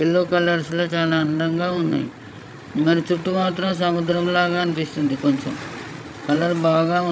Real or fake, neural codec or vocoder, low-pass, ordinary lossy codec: fake; codec, 16 kHz, 16 kbps, FreqCodec, larger model; none; none